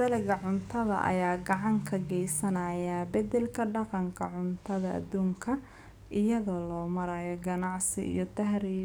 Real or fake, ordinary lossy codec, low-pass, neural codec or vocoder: fake; none; none; codec, 44.1 kHz, 7.8 kbps, DAC